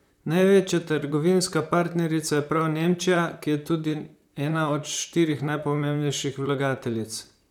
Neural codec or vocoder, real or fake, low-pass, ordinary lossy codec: vocoder, 44.1 kHz, 128 mel bands, Pupu-Vocoder; fake; 19.8 kHz; none